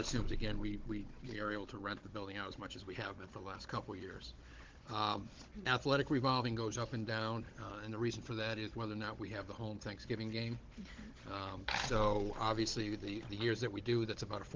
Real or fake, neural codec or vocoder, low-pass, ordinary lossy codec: fake; codec, 16 kHz, 16 kbps, FunCodec, trained on Chinese and English, 50 frames a second; 7.2 kHz; Opus, 16 kbps